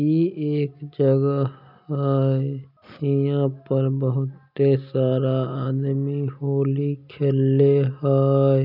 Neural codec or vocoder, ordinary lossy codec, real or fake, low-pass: none; none; real; 5.4 kHz